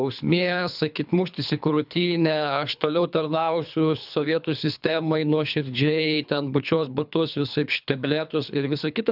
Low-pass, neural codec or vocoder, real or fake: 5.4 kHz; codec, 24 kHz, 3 kbps, HILCodec; fake